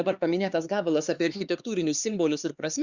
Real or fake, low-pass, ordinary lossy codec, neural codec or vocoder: fake; 7.2 kHz; Opus, 64 kbps; codec, 16 kHz, 4 kbps, X-Codec, HuBERT features, trained on LibriSpeech